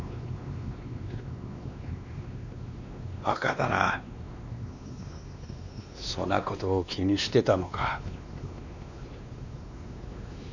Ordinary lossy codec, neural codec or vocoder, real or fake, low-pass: none; codec, 16 kHz, 2 kbps, X-Codec, WavLM features, trained on Multilingual LibriSpeech; fake; 7.2 kHz